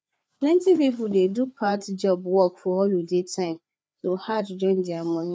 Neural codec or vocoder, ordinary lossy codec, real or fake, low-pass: codec, 16 kHz, 4 kbps, FreqCodec, larger model; none; fake; none